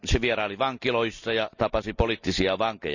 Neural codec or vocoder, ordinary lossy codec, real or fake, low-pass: none; none; real; 7.2 kHz